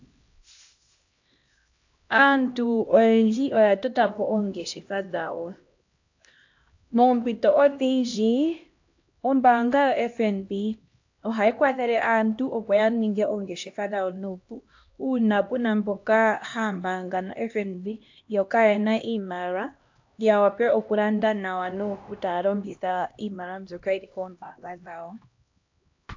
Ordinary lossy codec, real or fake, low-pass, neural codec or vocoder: AAC, 48 kbps; fake; 7.2 kHz; codec, 16 kHz, 1 kbps, X-Codec, HuBERT features, trained on LibriSpeech